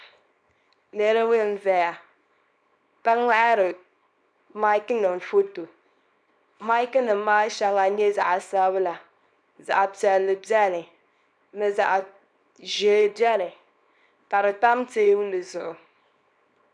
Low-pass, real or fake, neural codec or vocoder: 9.9 kHz; fake; codec, 24 kHz, 0.9 kbps, WavTokenizer, small release